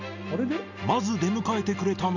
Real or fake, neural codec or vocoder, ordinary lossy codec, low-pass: real; none; none; 7.2 kHz